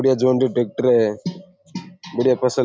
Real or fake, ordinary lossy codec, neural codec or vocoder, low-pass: real; none; none; none